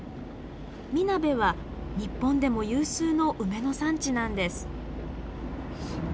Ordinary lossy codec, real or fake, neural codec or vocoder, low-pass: none; real; none; none